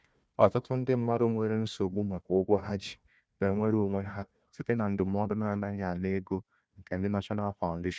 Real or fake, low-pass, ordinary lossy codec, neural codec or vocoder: fake; none; none; codec, 16 kHz, 1 kbps, FunCodec, trained on Chinese and English, 50 frames a second